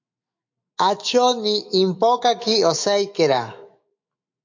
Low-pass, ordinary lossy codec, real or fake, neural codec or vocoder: 7.2 kHz; MP3, 48 kbps; fake; autoencoder, 48 kHz, 128 numbers a frame, DAC-VAE, trained on Japanese speech